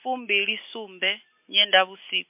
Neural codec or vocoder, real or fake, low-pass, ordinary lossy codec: none; real; 3.6 kHz; MP3, 32 kbps